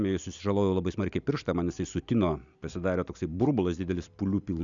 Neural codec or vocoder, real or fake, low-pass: none; real; 7.2 kHz